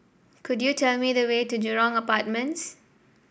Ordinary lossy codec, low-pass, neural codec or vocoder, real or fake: none; none; none; real